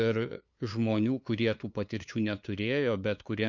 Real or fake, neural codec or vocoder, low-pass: fake; codec, 16 kHz, 2 kbps, FunCodec, trained on LibriTTS, 25 frames a second; 7.2 kHz